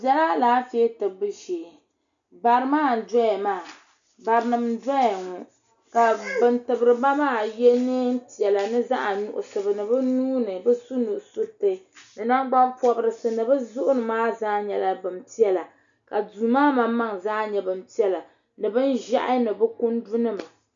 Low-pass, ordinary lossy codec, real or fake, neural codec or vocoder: 7.2 kHz; MP3, 96 kbps; real; none